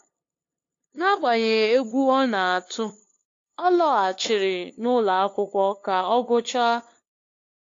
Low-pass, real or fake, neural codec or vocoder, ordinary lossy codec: 7.2 kHz; fake; codec, 16 kHz, 2 kbps, FunCodec, trained on LibriTTS, 25 frames a second; AAC, 48 kbps